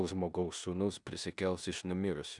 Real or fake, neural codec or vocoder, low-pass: fake; codec, 16 kHz in and 24 kHz out, 0.9 kbps, LongCat-Audio-Codec, four codebook decoder; 10.8 kHz